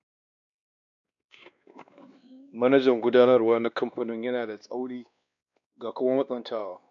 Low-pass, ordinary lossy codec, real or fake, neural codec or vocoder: 7.2 kHz; none; fake; codec, 16 kHz, 2 kbps, X-Codec, WavLM features, trained on Multilingual LibriSpeech